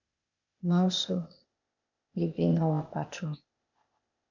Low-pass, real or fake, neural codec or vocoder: 7.2 kHz; fake; codec, 16 kHz, 0.8 kbps, ZipCodec